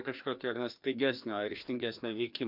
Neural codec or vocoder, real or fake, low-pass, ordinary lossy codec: codec, 16 kHz, 2 kbps, FreqCodec, larger model; fake; 5.4 kHz; MP3, 48 kbps